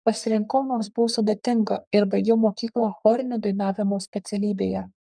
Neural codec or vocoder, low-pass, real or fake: codec, 44.1 kHz, 3.4 kbps, Pupu-Codec; 9.9 kHz; fake